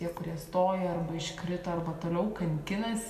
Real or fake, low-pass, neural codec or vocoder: real; 14.4 kHz; none